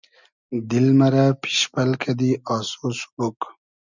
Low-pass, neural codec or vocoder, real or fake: 7.2 kHz; none; real